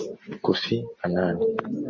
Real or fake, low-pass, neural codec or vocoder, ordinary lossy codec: real; 7.2 kHz; none; MP3, 32 kbps